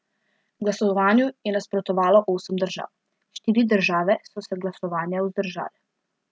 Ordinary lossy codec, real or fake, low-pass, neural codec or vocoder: none; real; none; none